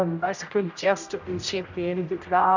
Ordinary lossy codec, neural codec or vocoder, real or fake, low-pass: none; codec, 16 kHz, 0.5 kbps, X-Codec, HuBERT features, trained on general audio; fake; 7.2 kHz